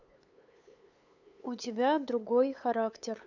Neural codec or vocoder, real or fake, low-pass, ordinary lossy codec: codec, 16 kHz, 8 kbps, FunCodec, trained on LibriTTS, 25 frames a second; fake; 7.2 kHz; none